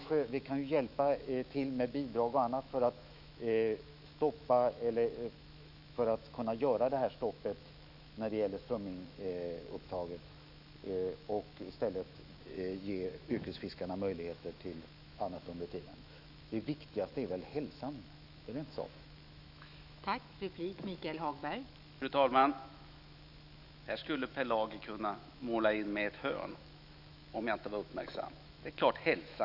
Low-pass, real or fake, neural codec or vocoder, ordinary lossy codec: 5.4 kHz; fake; autoencoder, 48 kHz, 128 numbers a frame, DAC-VAE, trained on Japanese speech; none